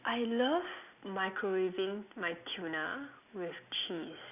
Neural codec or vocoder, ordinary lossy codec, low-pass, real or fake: none; none; 3.6 kHz; real